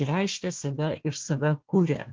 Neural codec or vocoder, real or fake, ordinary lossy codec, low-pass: codec, 16 kHz, 1 kbps, FunCodec, trained on Chinese and English, 50 frames a second; fake; Opus, 16 kbps; 7.2 kHz